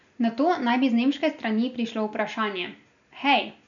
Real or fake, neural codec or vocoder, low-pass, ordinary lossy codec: real; none; 7.2 kHz; none